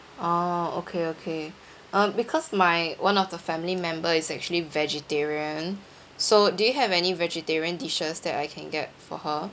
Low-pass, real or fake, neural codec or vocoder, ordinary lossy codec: none; real; none; none